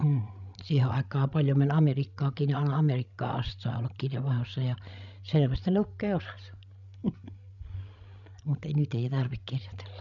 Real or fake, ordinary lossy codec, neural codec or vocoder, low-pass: fake; none; codec, 16 kHz, 16 kbps, FreqCodec, larger model; 7.2 kHz